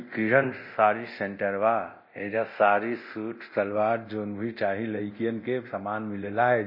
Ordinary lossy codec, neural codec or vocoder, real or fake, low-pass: MP3, 24 kbps; codec, 24 kHz, 0.9 kbps, DualCodec; fake; 5.4 kHz